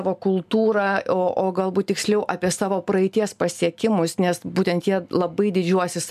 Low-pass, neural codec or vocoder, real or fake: 14.4 kHz; none; real